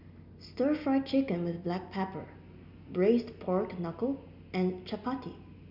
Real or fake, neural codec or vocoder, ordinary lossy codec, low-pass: real; none; none; 5.4 kHz